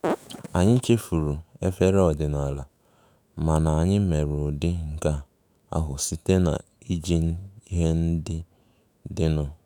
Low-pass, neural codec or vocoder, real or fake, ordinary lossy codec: none; autoencoder, 48 kHz, 128 numbers a frame, DAC-VAE, trained on Japanese speech; fake; none